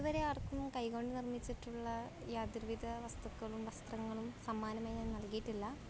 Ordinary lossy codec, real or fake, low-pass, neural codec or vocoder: none; real; none; none